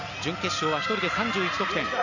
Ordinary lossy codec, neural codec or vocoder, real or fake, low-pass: none; none; real; 7.2 kHz